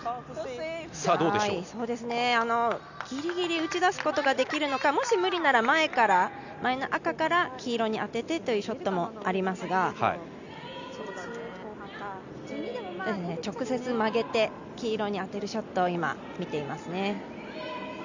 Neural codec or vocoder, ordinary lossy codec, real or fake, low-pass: none; none; real; 7.2 kHz